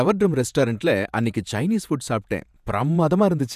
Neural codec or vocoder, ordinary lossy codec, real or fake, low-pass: vocoder, 48 kHz, 128 mel bands, Vocos; none; fake; 14.4 kHz